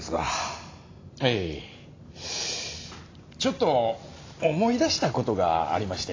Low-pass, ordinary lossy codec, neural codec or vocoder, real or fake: 7.2 kHz; AAC, 32 kbps; none; real